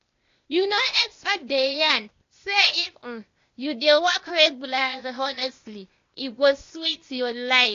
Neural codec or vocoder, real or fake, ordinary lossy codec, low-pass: codec, 16 kHz, 0.8 kbps, ZipCodec; fake; AAC, 48 kbps; 7.2 kHz